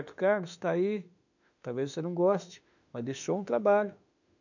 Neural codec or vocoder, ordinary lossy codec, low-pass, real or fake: autoencoder, 48 kHz, 32 numbers a frame, DAC-VAE, trained on Japanese speech; none; 7.2 kHz; fake